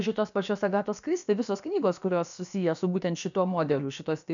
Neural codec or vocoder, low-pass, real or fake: codec, 16 kHz, about 1 kbps, DyCAST, with the encoder's durations; 7.2 kHz; fake